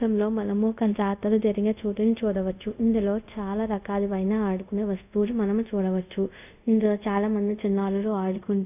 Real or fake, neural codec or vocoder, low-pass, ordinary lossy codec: fake; codec, 24 kHz, 0.5 kbps, DualCodec; 3.6 kHz; none